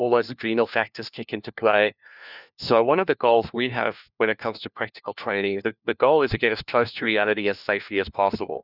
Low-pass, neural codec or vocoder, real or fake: 5.4 kHz; codec, 16 kHz, 1 kbps, FunCodec, trained on LibriTTS, 50 frames a second; fake